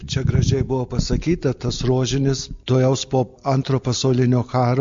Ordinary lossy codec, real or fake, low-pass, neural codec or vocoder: MP3, 48 kbps; real; 7.2 kHz; none